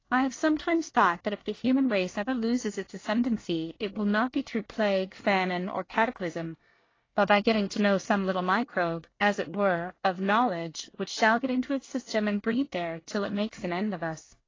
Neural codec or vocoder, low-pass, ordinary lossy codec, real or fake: codec, 24 kHz, 1 kbps, SNAC; 7.2 kHz; AAC, 32 kbps; fake